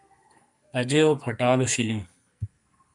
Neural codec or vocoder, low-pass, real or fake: codec, 44.1 kHz, 2.6 kbps, SNAC; 10.8 kHz; fake